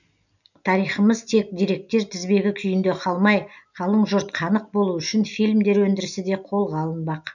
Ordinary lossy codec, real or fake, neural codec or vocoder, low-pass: none; real; none; 7.2 kHz